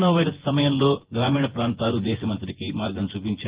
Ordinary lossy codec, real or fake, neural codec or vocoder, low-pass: Opus, 16 kbps; fake; vocoder, 24 kHz, 100 mel bands, Vocos; 3.6 kHz